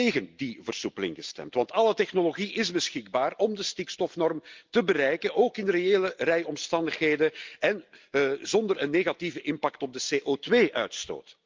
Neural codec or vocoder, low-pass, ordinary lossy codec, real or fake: none; 7.2 kHz; Opus, 24 kbps; real